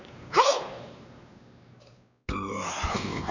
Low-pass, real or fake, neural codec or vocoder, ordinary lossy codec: 7.2 kHz; fake; codec, 16 kHz, 2 kbps, X-Codec, WavLM features, trained on Multilingual LibriSpeech; none